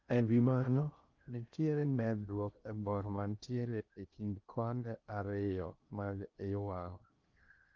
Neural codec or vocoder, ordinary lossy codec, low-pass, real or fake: codec, 16 kHz in and 24 kHz out, 0.6 kbps, FocalCodec, streaming, 2048 codes; Opus, 24 kbps; 7.2 kHz; fake